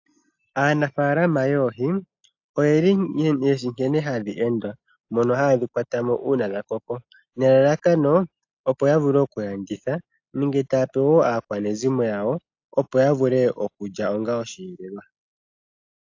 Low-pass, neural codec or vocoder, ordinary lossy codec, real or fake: 7.2 kHz; none; AAC, 48 kbps; real